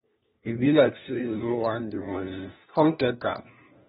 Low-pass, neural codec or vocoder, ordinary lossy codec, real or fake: 7.2 kHz; codec, 16 kHz, 1 kbps, FunCodec, trained on LibriTTS, 50 frames a second; AAC, 16 kbps; fake